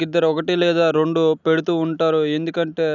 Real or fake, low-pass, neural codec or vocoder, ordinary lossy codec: real; 7.2 kHz; none; none